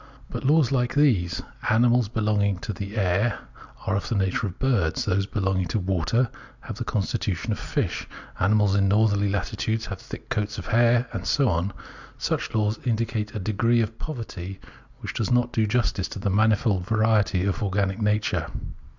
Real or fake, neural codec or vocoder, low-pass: real; none; 7.2 kHz